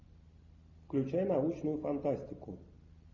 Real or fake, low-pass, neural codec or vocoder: real; 7.2 kHz; none